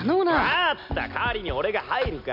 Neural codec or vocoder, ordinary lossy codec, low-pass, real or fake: none; none; 5.4 kHz; real